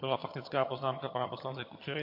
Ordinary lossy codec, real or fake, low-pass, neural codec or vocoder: MP3, 32 kbps; fake; 5.4 kHz; vocoder, 22.05 kHz, 80 mel bands, HiFi-GAN